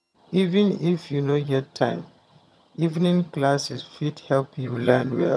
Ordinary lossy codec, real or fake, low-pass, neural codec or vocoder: none; fake; none; vocoder, 22.05 kHz, 80 mel bands, HiFi-GAN